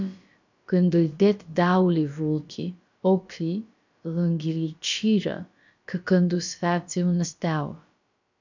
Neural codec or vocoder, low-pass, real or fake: codec, 16 kHz, about 1 kbps, DyCAST, with the encoder's durations; 7.2 kHz; fake